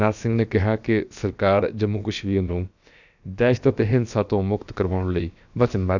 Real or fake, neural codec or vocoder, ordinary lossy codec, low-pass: fake; codec, 16 kHz, about 1 kbps, DyCAST, with the encoder's durations; none; 7.2 kHz